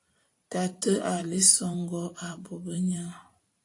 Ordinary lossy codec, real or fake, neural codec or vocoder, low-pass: AAC, 48 kbps; fake; vocoder, 44.1 kHz, 128 mel bands every 512 samples, BigVGAN v2; 10.8 kHz